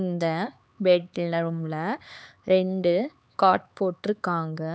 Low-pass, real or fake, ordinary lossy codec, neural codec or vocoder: none; fake; none; codec, 16 kHz, 4 kbps, X-Codec, HuBERT features, trained on LibriSpeech